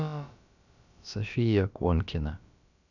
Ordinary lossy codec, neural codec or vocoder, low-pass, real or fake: none; codec, 16 kHz, about 1 kbps, DyCAST, with the encoder's durations; 7.2 kHz; fake